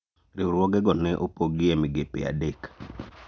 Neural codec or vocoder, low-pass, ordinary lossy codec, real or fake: none; none; none; real